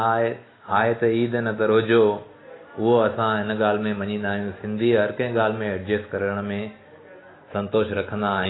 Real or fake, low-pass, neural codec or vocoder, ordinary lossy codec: real; 7.2 kHz; none; AAC, 16 kbps